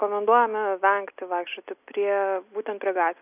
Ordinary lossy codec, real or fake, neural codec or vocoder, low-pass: AAC, 32 kbps; real; none; 3.6 kHz